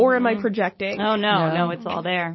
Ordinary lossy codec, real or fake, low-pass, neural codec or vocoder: MP3, 24 kbps; real; 7.2 kHz; none